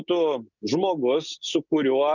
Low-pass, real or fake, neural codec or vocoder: 7.2 kHz; real; none